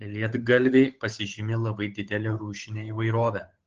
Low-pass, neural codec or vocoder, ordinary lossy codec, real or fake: 7.2 kHz; codec, 16 kHz, 8 kbps, FunCodec, trained on Chinese and English, 25 frames a second; Opus, 16 kbps; fake